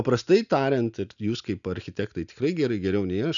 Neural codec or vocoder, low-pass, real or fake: none; 7.2 kHz; real